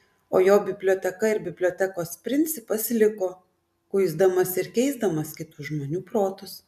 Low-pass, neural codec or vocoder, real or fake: 14.4 kHz; none; real